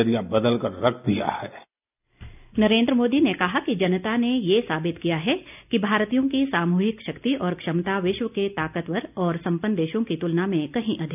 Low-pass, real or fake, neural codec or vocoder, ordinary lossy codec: 3.6 kHz; real; none; none